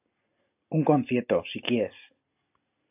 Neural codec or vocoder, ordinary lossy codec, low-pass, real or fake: none; AAC, 32 kbps; 3.6 kHz; real